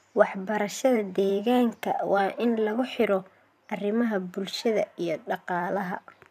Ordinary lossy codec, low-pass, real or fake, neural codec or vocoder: none; 14.4 kHz; fake; vocoder, 44.1 kHz, 128 mel bands every 512 samples, BigVGAN v2